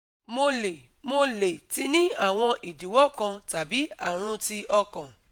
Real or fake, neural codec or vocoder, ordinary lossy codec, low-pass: fake; vocoder, 48 kHz, 128 mel bands, Vocos; none; none